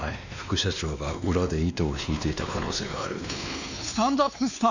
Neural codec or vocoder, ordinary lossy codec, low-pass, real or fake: codec, 16 kHz, 2 kbps, X-Codec, WavLM features, trained on Multilingual LibriSpeech; none; 7.2 kHz; fake